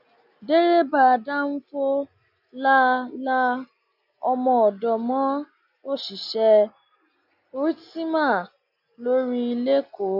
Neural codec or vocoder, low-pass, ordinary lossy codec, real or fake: none; 5.4 kHz; none; real